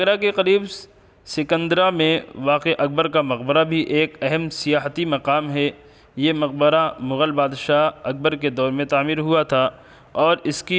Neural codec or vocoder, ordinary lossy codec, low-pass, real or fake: none; none; none; real